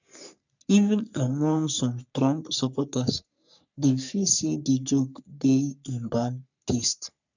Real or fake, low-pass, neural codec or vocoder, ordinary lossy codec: fake; 7.2 kHz; codec, 44.1 kHz, 3.4 kbps, Pupu-Codec; none